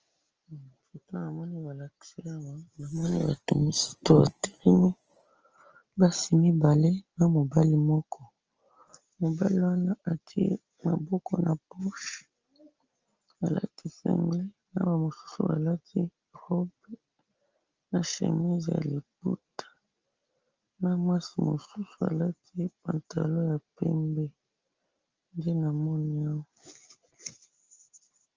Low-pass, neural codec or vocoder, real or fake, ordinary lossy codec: 7.2 kHz; none; real; Opus, 24 kbps